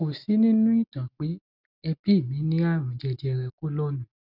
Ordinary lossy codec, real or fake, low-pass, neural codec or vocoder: none; real; 5.4 kHz; none